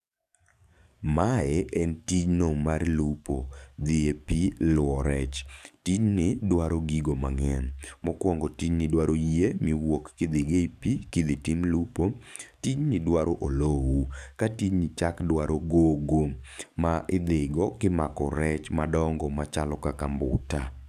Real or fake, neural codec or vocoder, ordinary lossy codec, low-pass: fake; codec, 44.1 kHz, 7.8 kbps, DAC; none; 14.4 kHz